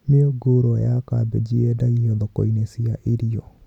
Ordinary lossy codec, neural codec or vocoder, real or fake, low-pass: none; none; real; 19.8 kHz